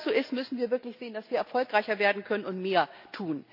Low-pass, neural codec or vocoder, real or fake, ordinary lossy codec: 5.4 kHz; none; real; none